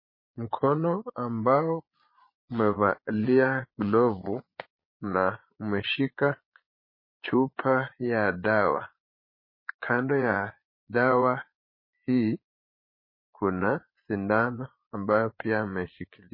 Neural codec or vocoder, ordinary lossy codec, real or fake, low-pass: vocoder, 22.05 kHz, 80 mel bands, Vocos; MP3, 24 kbps; fake; 5.4 kHz